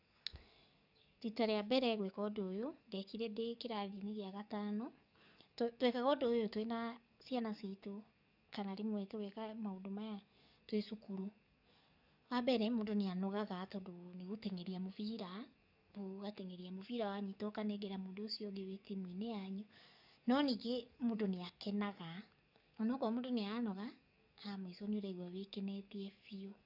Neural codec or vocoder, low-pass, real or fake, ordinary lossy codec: codec, 44.1 kHz, 7.8 kbps, DAC; 5.4 kHz; fake; none